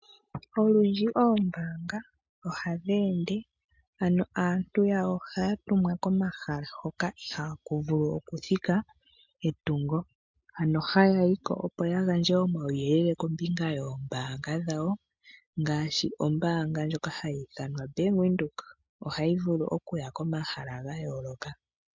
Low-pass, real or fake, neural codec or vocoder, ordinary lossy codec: 7.2 kHz; real; none; MP3, 64 kbps